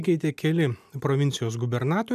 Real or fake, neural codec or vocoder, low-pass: real; none; 14.4 kHz